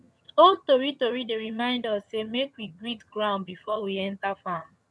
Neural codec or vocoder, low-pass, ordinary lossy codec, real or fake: vocoder, 22.05 kHz, 80 mel bands, HiFi-GAN; none; none; fake